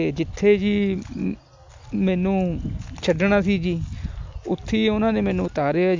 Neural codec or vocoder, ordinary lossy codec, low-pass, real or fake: none; MP3, 64 kbps; 7.2 kHz; real